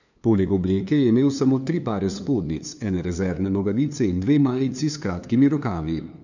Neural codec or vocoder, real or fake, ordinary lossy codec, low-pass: codec, 16 kHz, 2 kbps, FunCodec, trained on LibriTTS, 25 frames a second; fake; none; 7.2 kHz